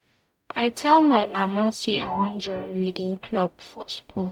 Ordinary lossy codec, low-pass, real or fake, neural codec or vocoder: none; 19.8 kHz; fake; codec, 44.1 kHz, 0.9 kbps, DAC